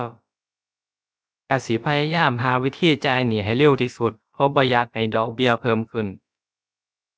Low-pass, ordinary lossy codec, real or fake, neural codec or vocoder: none; none; fake; codec, 16 kHz, about 1 kbps, DyCAST, with the encoder's durations